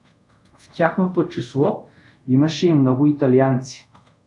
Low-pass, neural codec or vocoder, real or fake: 10.8 kHz; codec, 24 kHz, 0.5 kbps, DualCodec; fake